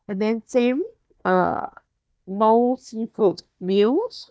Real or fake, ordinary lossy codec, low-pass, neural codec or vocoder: fake; none; none; codec, 16 kHz, 1 kbps, FunCodec, trained on Chinese and English, 50 frames a second